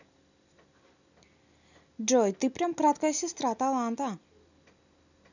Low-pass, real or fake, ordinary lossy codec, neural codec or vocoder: 7.2 kHz; real; none; none